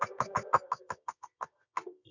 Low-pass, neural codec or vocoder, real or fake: 7.2 kHz; codec, 24 kHz, 0.9 kbps, WavTokenizer, medium music audio release; fake